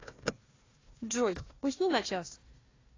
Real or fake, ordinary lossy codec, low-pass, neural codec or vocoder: fake; AAC, 32 kbps; 7.2 kHz; codec, 16 kHz, 1 kbps, FunCodec, trained on Chinese and English, 50 frames a second